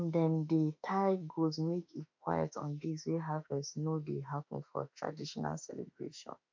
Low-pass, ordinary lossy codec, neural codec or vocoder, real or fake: 7.2 kHz; MP3, 64 kbps; autoencoder, 48 kHz, 32 numbers a frame, DAC-VAE, trained on Japanese speech; fake